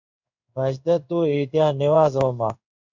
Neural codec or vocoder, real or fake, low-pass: codec, 16 kHz in and 24 kHz out, 1 kbps, XY-Tokenizer; fake; 7.2 kHz